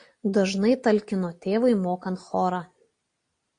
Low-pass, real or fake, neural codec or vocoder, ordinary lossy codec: 9.9 kHz; real; none; AAC, 48 kbps